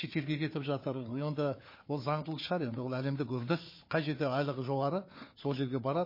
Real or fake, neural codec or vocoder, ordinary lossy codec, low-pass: fake; codec, 16 kHz, 4 kbps, FunCodec, trained on Chinese and English, 50 frames a second; MP3, 24 kbps; 5.4 kHz